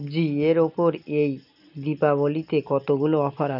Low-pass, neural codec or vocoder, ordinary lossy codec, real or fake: 5.4 kHz; none; none; real